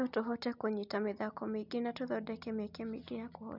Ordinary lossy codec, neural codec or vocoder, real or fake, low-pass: none; none; real; 5.4 kHz